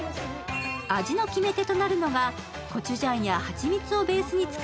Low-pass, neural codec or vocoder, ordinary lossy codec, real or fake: none; none; none; real